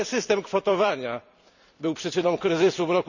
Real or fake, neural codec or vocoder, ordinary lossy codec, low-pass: fake; vocoder, 44.1 kHz, 128 mel bands every 256 samples, BigVGAN v2; none; 7.2 kHz